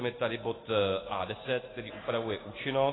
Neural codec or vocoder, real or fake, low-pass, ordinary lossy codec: none; real; 7.2 kHz; AAC, 16 kbps